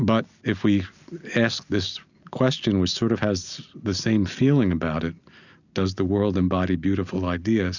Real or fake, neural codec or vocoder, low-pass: real; none; 7.2 kHz